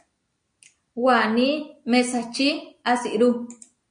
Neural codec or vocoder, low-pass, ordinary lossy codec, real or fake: none; 9.9 kHz; MP3, 48 kbps; real